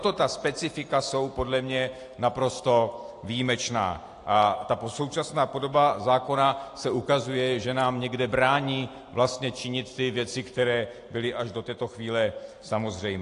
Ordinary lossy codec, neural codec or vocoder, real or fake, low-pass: AAC, 48 kbps; none; real; 10.8 kHz